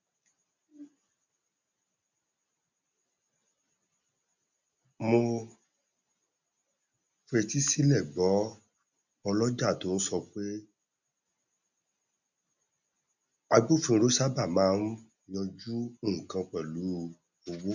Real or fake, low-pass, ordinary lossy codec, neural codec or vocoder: real; 7.2 kHz; none; none